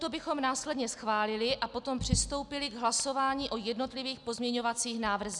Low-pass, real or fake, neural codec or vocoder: 10.8 kHz; real; none